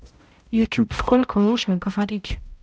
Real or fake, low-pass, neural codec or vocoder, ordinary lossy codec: fake; none; codec, 16 kHz, 0.5 kbps, X-Codec, HuBERT features, trained on balanced general audio; none